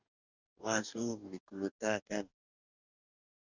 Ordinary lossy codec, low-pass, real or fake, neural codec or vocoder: Opus, 64 kbps; 7.2 kHz; fake; codec, 44.1 kHz, 2.6 kbps, DAC